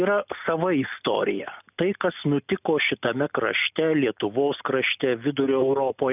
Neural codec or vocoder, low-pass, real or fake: none; 3.6 kHz; real